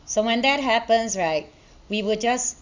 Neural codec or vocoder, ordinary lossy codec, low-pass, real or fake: none; Opus, 64 kbps; 7.2 kHz; real